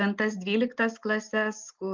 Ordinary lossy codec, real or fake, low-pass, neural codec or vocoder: Opus, 24 kbps; real; 7.2 kHz; none